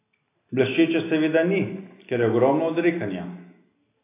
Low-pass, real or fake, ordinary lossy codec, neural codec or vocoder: 3.6 kHz; real; AAC, 24 kbps; none